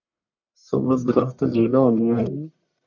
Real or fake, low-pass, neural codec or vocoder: fake; 7.2 kHz; codec, 44.1 kHz, 1.7 kbps, Pupu-Codec